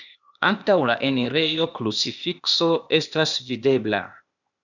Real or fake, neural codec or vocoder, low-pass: fake; codec, 16 kHz, 0.8 kbps, ZipCodec; 7.2 kHz